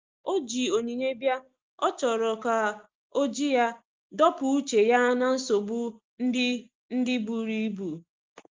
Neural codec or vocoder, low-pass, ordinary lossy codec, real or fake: none; 7.2 kHz; Opus, 32 kbps; real